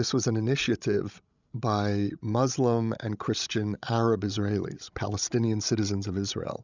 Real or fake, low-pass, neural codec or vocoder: fake; 7.2 kHz; codec, 16 kHz, 16 kbps, FreqCodec, larger model